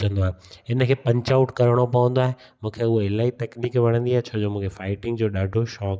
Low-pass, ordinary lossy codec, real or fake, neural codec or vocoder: none; none; real; none